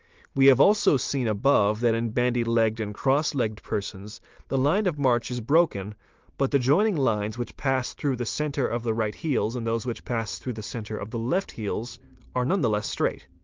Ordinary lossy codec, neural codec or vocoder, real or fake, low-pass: Opus, 32 kbps; none; real; 7.2 kHz